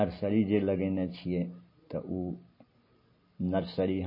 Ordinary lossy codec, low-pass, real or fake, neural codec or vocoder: MP3, 24 kbps; 5.4 kHz; real; none